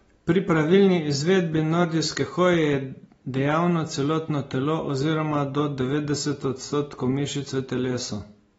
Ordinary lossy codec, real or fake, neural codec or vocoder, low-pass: AAC, 24 kbps; real; none; 10.8 kHz